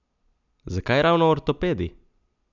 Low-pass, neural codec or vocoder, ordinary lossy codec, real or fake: 7.2 kHz; none; none; real